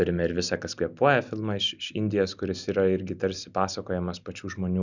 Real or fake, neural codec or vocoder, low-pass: real; none; 7.2 kHz